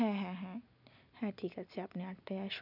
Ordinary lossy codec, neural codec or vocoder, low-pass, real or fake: none; none; 5.4 kHz; real